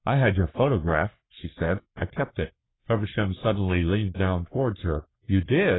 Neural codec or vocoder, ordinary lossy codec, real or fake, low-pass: codec, 44.1 kHz, 3.4 kbps, Pupu-Codec; AAC, 16 kbps; fake; 7.2 kHz